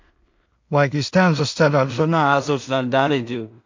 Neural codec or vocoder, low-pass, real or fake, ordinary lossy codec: codec, 16 kHz in and 24 kHz out, 0.4 kbps, LongCat-Audio-Codec, two codebook decoder; 7.2 kHz; fake; MP3, 48 kbps